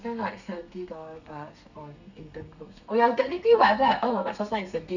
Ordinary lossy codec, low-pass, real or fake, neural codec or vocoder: none; 7.2 kHz; fake; codec, 32 kHz, 1.9 kbps, SNAC